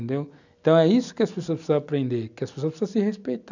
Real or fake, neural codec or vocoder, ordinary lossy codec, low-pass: real; none; none; 7.2 kHz